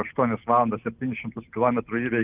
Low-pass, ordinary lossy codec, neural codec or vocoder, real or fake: 3.6 kHz; Opus, 24 kbps; none; real